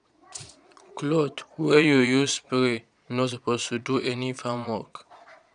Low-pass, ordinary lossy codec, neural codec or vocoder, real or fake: 9.9 kHz; none; vocoder, 22.05 kHz, 80 mel bands, Vocos; fake